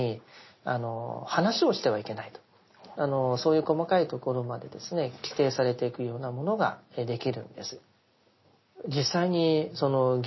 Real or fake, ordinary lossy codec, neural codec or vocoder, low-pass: real; MP3, 24 kbps; none; 7.2 kHz